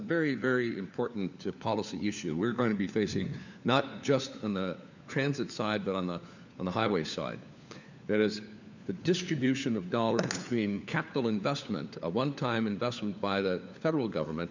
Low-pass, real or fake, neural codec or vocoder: 7.2 kHz; fake; codec, 16 kHz, 4 kbps, FunCodec, trained on LibriTTS, 50 frames a second